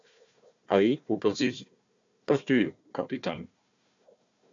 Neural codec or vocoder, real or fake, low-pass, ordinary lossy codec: codec, 16 kHz, 1 kbps, FunCodec, trained on Chinese and English, 50 frames a second; fake; 7.2 kHz; AAC, 64 kbps